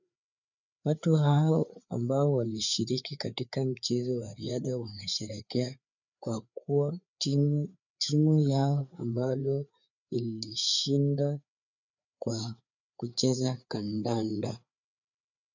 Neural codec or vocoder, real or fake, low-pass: codec, 16 kHz, 4 kbps, FreqCodec, larger model; fake; 7.2 kHz